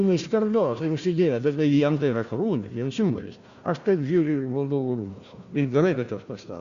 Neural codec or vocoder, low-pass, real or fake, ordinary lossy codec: codec, 16 kHz, 1 kbps, FunCodec, trained on Chinese and English, 50 frames a second; 7.2 kHz; fake; Opus, 64 kbps